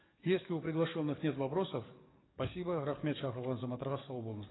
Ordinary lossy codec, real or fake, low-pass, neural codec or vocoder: AAC, 16 kbps; fake; 7.2 kHz; codec, 44.1 kHz, 7.8 kbps, DAC